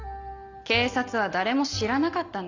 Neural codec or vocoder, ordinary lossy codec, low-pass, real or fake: none; none; 7.2 kHz; real